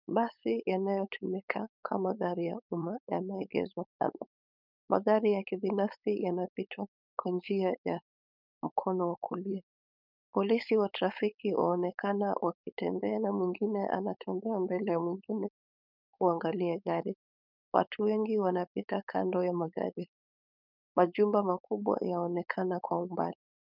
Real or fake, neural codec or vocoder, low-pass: fake; codec, 16 kHz, 4.8 kbps, FACodec; 5.4 kHz